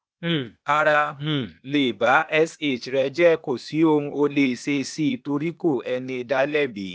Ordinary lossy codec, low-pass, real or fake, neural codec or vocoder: none; none; fake; codec, 16 kHz, 0.8 kbps, ZipCodec